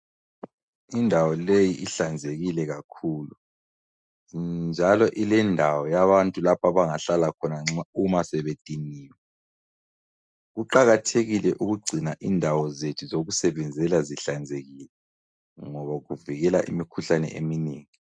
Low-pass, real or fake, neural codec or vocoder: 9.9 kHz; real; none